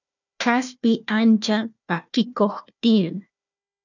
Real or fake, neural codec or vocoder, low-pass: fake; codec, 16 kHz, 1 kbps, FunCodec, trained on Chinese and English, 50 frames a second; 7.2 kHz